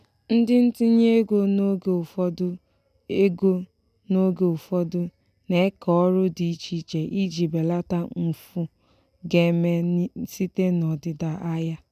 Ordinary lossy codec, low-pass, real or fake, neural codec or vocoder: none; 14.4 kHz; real; none